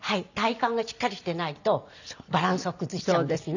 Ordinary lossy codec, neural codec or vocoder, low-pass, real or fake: none; none; 7.2 kHz; real